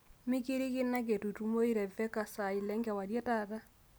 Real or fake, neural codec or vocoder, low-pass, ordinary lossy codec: real; none; none; none